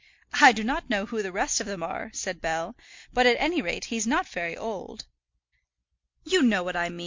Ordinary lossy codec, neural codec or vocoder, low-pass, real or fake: MP3, 48 kbps; none; 7.2 kHz; real